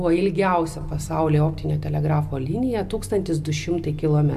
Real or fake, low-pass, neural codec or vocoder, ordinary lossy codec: real; 14.4 kHz; none; AAC, 96 kbps